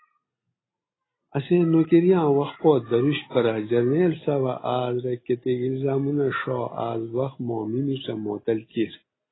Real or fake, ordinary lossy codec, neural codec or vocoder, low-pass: real; AAC, 16 kbps; none; 7.2 kHz